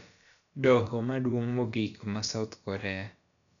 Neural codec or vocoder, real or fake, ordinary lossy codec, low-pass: codec, 16 kHz, about 1 kbps, DyCAST, with the encoder's durations; fake; none; 7.2 kHz